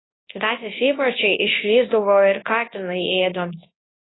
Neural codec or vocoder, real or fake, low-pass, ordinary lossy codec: codec, 24 kHz, 0.9 kbps, WavTokenizer, large speech release; fake; 7.2 kHz; AAC, 16 kbps